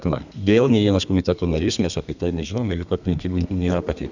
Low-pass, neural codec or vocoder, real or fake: 7.2 kHz; codec, 32 kHz, 1.9 kbps, SNAC; fake